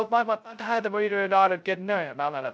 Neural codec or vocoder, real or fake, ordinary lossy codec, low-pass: codec, 16 kHz, 0.2 kbps, FocalCodec; fake; none; none